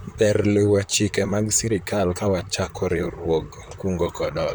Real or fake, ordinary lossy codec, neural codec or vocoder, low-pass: fake; none; vocoder, 44.1 kHz, 128 mel bands, Pupu-Vocoder; none